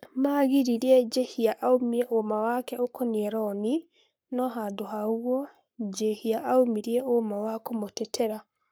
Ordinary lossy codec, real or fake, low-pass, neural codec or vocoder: none; fake; none; codec, 44.1 kHz, 7.8 kbps, Pupu-Codec